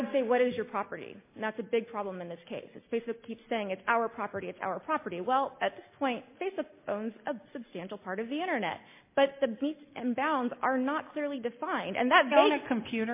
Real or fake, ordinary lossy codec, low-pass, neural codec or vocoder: real; MP3, 24 kbps; 3.6 kHz; none